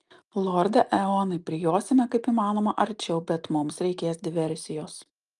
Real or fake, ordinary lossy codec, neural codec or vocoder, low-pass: real; Opus, 32 kbps; none; 10.8 kHz